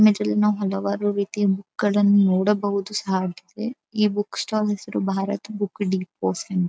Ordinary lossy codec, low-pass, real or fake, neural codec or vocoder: none; none; real; none